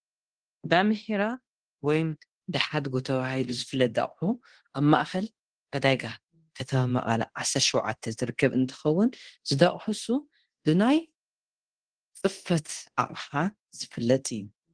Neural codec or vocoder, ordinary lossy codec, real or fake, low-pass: codec, 24 kHz, 0.9 kbps, DualCodec; Opus, 16 kbps; fake; 9.9 kHz